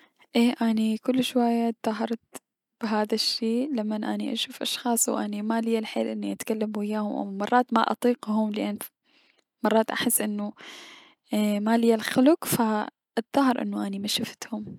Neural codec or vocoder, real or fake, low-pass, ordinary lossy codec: none; real; 19.8 kHz; none